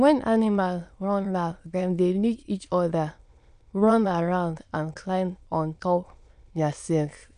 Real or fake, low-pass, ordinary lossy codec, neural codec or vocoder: fake; 9.9 kHz; none; autoencoder, 22.05 kHz, a latent of 192 numbers a frame, VITS, trained on many speakers